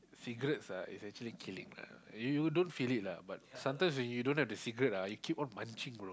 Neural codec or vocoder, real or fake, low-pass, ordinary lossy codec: none; real; none; none